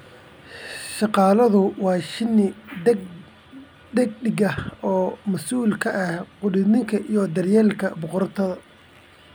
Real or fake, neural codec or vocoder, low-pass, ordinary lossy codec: real; none; none; none